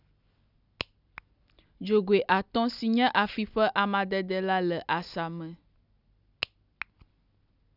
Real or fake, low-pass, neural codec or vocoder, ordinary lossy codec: real; 5.4 kHz; none; none